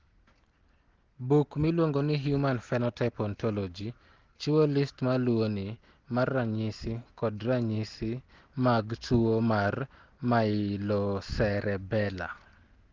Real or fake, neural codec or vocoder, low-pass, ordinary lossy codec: real; none; 7.2 kHz; Opus, 16 kbps